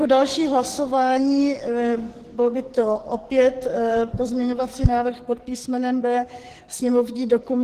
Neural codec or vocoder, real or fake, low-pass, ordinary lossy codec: codec, 44.1 kHz, 2.6 kbps, SNAC; fake; 14.4 kHz; Opus, 16 kbps